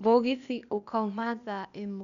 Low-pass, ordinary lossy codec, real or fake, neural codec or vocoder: 7.2 kHz; none; fake; codec, 16 kHz, 0.8 kbps, ZipCodec